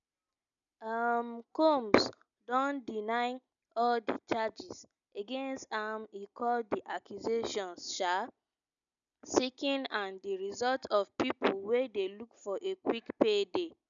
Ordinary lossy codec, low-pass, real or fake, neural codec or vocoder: none; 7.2 kHz; real; none